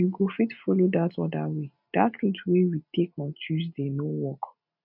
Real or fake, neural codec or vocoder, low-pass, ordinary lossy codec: real; none; 5.4 kHz; none